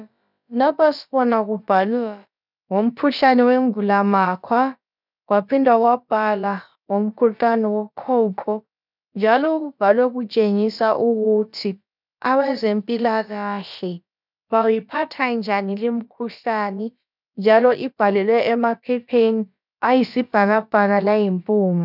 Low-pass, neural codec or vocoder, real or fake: 5.4 kHz; codec, 16 kHz, about 1 kbps, DyCAST, with the encoder's durations; fake